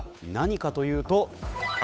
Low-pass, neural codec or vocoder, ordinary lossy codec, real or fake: none; codec, 16 kHz, 8 kbps, FunCodec, trained on Chinese and English, 25 frames a second; none; fake